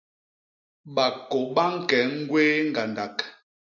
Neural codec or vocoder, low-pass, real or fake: none; 7.2 kHz; real